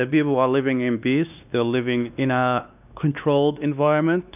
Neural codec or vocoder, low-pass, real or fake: codec, 16 kHz, 2 kbps, X-Codec, WavLM features, trained on Multilingual LibriSpeech; 3.6 kHz; fake